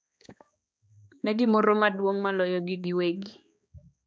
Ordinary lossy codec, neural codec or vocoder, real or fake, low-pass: none; codec, 16 kHz, 2 kbps, X-Codec, HuBERT features, trained on balanced general audio; fake; none